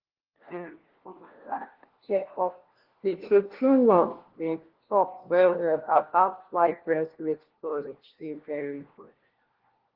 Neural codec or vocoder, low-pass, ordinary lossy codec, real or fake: codec, 16 kHz, 1 kbps, FunCodec, trained on LibriTTS, 50 frames a second; 5.4 kHz; Opus, 16 kbps; fake